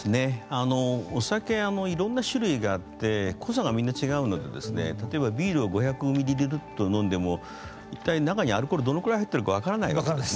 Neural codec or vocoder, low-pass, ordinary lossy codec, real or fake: none; none; none; real